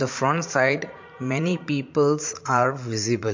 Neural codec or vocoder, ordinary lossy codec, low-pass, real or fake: vocoder, 44.1 kHz, 80 mel bands, Vocos; MP3, 48 kbps; 7.2 kHz; fake